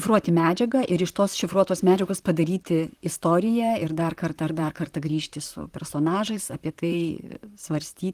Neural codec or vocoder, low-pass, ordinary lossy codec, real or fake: vocoder, 44.1 kHz, 128 mel bands, Pupu-Vocoder; 14.4 kHz; Opus, 24 kbps; fake